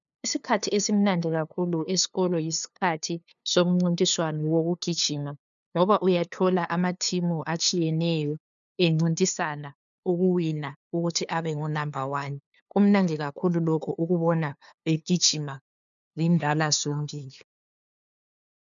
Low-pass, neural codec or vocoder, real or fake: 7.2 kHz; codec, 16 kHz, 2 kbps, FunCodec, trained on LibriTTS, 25 frames a second; fake